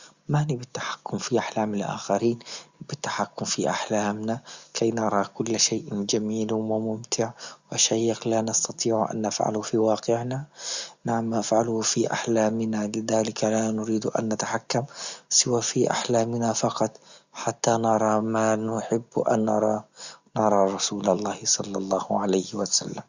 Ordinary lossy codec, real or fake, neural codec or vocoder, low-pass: Opus, 64 kbps; real; none; 7.2 kHz